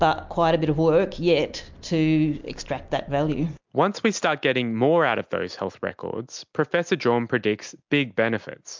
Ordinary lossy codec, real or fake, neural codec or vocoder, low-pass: MP3, 64 kbps; real; none; 7.2 kHz